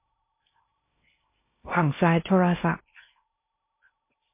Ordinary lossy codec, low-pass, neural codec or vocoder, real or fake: MP3, 24 kbps; 3.6 kHz; codec, 16 kHz in and 24 kHz out, 0.6 kbps, FocalCodec, streaming, 2048 codes; fake